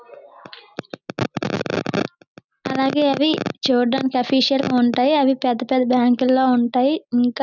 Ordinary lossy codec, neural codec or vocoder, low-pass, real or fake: none; none; 7.2 kHz; real